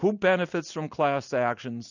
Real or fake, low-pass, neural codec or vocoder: real; 7.2 kHz; none